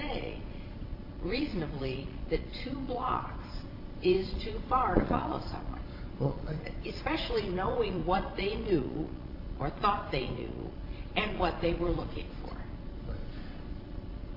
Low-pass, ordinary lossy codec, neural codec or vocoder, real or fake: 5.4 kHz; AAC, 32 kbps; vocoder, 22.05 kHz, 80 mel bands, Vocos; fake